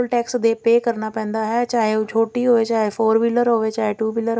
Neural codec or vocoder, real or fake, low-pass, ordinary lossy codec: none; real; none; none